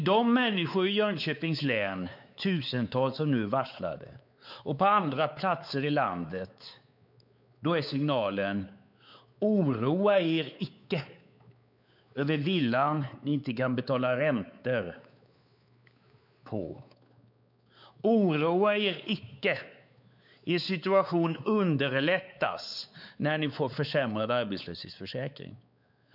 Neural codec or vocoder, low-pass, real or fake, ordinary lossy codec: codec, 16 kHz, 4 kbps, X-Codec, WavLM features, trained on Multilingual LibriSpeech; 5.4 kHz; fake; none